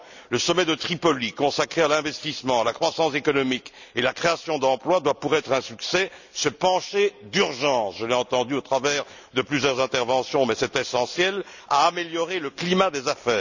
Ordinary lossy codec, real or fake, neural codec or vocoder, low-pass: none; real; none; 7.2 kHz